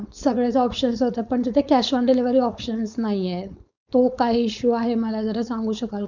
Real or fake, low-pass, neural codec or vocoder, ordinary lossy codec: fake; 7.2 kHz; codec, 16 kHz, 4.8 kbps, FACodec; none